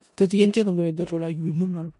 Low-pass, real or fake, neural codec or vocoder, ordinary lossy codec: 10.8 kHz; fake; codec, 16 kHz in and 24 kHz out, 0.4 kbps, LongCat-Audio-Codec, four codebook decoder; none